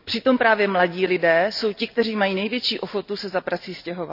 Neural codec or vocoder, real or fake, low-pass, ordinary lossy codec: none; real; 5.4 kHz; none